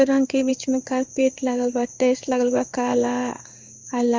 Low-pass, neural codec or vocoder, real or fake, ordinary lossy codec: 7.2 kHz; codec, 16 kHz in and 24 kHz out, 1 kbps, XY-Tokenizer; fake; Opus, 32 kbps